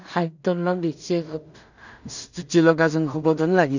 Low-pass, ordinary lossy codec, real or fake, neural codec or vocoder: 7.2 kHz; none; fake; codec, 16 kHz in and 24 kHz out, 0.4 kbps, LongCat-Audio-Codec, two codebook decoder